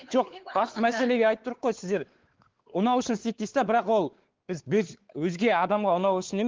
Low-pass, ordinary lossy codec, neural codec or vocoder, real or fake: 7.2 kHz; Opus, 16 kbps; codec, 16 kHz, 4 kbps, X-Codec, WavLM features, trained on Multilingual LibriSpeech; fake